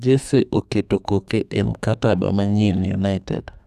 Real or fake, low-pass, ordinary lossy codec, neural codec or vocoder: fake; 14.4 kHz; none; codec, 32 kHz, 1.9 kbps, SNAC